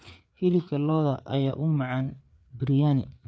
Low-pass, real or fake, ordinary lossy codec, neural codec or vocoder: none; fake; none; codec, 16 kHz, 4 kbps, FreqCodec, larger model